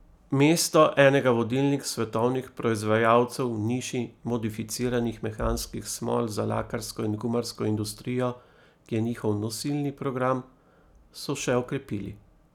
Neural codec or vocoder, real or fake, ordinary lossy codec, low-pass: none; real; none; 19.8 kHz